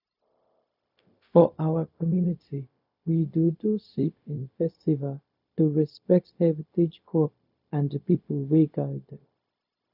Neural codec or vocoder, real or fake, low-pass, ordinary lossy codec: codec, 16 kHz, 0.4 kbps, LongCat-Audio-Codec; fake; 5.4 kHz; none